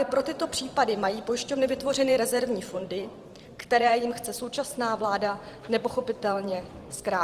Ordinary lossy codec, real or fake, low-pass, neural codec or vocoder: Opus, 24 kbps; fake; 14.4 kHz; vocoder, 44.1 kHz, 128 mel bands every 512 samples, BigVGAN v2